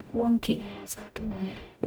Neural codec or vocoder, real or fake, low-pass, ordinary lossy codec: codec, 44.1 kHz, 0.9 kbps, DAC; fake; none; none